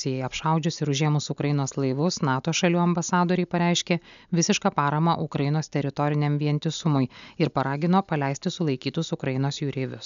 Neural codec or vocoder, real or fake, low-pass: none; real; 7.2 kHz